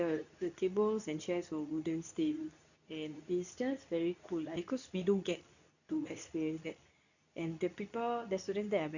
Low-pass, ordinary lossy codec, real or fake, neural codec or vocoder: 7.2 kHz; none; fake; codec, 24 kHz, 0.9 kbps, WavTokenizer, medium speech release version 2